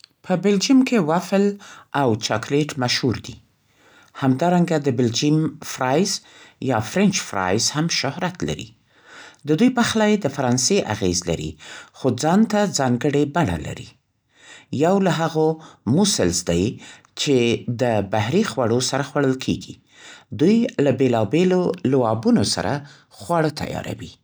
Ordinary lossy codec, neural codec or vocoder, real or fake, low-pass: none; none; real; none